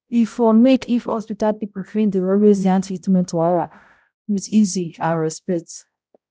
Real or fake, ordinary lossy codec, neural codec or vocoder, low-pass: fake; none; codec, 16 kHz, 0.5 kbps, X-Codec, HuBERT features, trained on balanced general audio; none